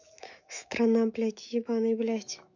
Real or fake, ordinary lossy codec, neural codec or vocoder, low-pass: fake; none; autoencoder, 48 kHz, 128 numbers a frame, DAC-VAE, trained on Japanese speech; 7.2 kHz